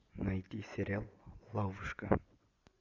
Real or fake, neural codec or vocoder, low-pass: fake; vocoder, 24 kHz, 100 mel bands, Vocos; 7.2 kHz